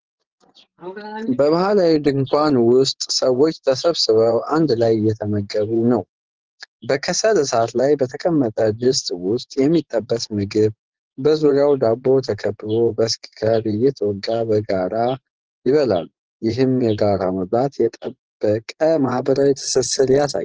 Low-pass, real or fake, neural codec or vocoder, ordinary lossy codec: 7.2 kHz; fake; vocoder, 24 kHz, 100 mel bands, Vocos; Opus, 16 kbps